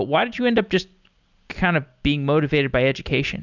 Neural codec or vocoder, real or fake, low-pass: none; real; 7.2 kHz